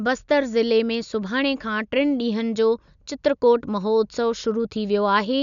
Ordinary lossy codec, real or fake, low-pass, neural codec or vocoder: none; real; 7.2 kHz; none